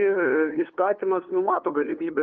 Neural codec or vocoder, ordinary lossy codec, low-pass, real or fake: codec, 16 kHz, 2 kbps, FunCodec, trained on LibriTTS, 25 frames a second; Opus, 32 kbps; 7.2 kHz; fake